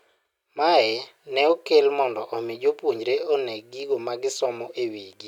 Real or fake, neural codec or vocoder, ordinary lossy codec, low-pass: real; none; none; 19.8 kHz